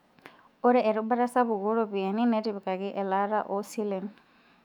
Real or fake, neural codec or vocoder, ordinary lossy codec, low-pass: fake; autoencoder, 48 kHz, 128 numbers a frame, DAC-VAE, trained on Japanese speech; none; 19.8 kHz